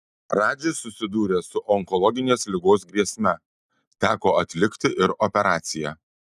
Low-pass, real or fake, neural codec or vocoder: 14.4 kHz; real; none